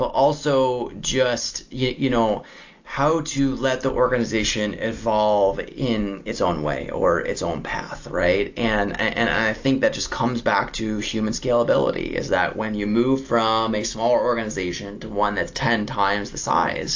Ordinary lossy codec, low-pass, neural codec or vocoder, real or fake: AAC, 48 kbps; 7.2 kHz; none; real